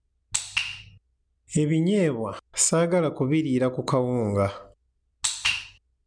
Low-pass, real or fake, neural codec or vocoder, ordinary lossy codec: 9.9 kHz; real; none; none